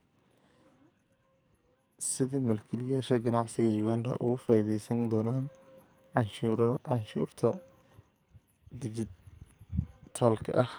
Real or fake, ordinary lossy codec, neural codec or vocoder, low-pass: fake; none; codec, 44.1 kHz, 2.6 kbps, SNAC; none